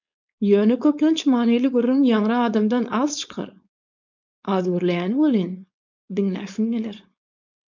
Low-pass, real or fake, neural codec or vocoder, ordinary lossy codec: 7.2 kHz; fake; codec, 16 kHz, 4.8 kbps, FACodec; MP3, 64 kbps